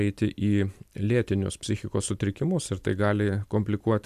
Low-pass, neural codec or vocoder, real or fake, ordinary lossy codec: 14.4 kHz; none; real; MP3, 96 kbps